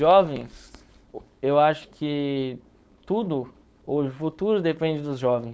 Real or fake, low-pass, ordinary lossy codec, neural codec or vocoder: fake; none; none; codec, 16 kHz, 4.8 kbps, FACodec